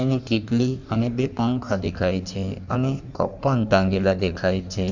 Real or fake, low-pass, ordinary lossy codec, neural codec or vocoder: fake; 7.2 kHz; none; codec, 16 kHz in and 24 kHz out, 1.1 kbps, FireRedTTS-2 codec